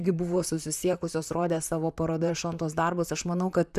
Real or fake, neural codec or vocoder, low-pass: fake; vocoder, 44.1 kHz, 128 mel bands, Pupu-Vocoder; 14.4 kHz